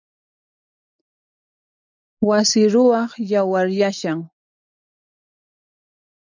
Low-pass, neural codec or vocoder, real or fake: 7.2 kHz; none; real